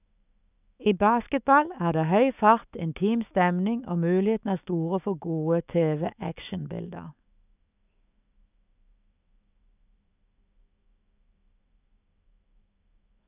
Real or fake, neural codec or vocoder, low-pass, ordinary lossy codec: fake; autoencoder, 48 kHz, 128 numbers a frame, DAC-VAE, trained on Japanese speech; 3.6 kHz; none